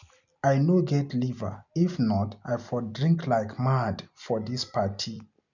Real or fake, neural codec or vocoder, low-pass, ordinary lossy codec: real; none; 7.2 kHz; none